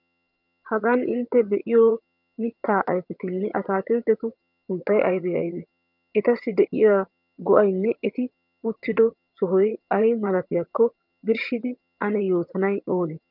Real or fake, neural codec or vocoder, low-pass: fake; vocoder, 22.05 kHz, 80 mel bands, HiFi-GAN; 5.4 kHz